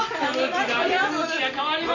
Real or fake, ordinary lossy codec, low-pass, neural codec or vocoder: real; none; 7.2 kHz; none